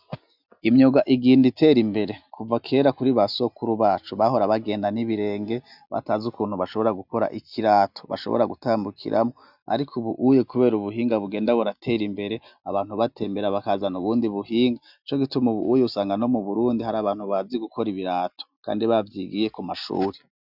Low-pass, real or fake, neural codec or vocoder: 5.4 kHz; real; none